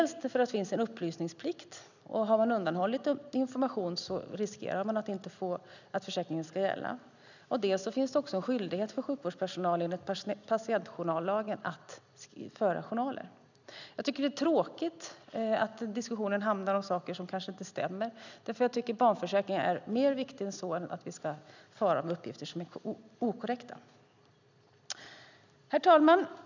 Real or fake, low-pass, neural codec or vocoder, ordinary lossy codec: real; 7.2 kHz; none; none